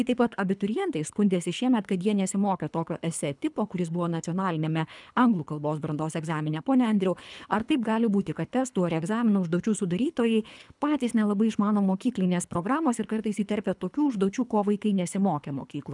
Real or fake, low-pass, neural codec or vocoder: fake; 10.8 kHz; codec, 24 kHz, 3 kbps, HILCodec